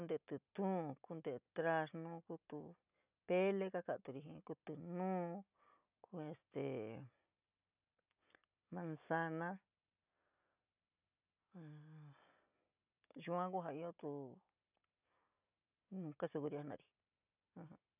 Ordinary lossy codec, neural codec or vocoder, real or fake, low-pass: none; none; real; 3.6 kHz